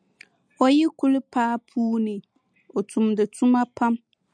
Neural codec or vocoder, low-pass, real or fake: none; 9.9 kHz; real